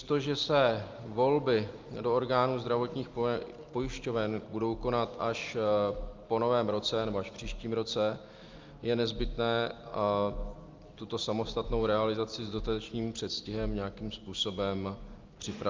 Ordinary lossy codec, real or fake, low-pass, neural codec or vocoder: Opus, 24 kbps; real; 7.2 kHz; none